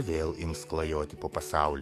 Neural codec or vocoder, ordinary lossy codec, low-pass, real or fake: codec, 44.1 kHz, 7.8 kbps, DAC; MP3, 64 kbps; 14.4 kHz; fake